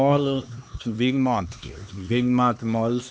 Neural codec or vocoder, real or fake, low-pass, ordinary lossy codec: codec, 16 kHz, 2 kbps, X-Codec, HuBERT features, trained on LibriSpeech; fake; none; none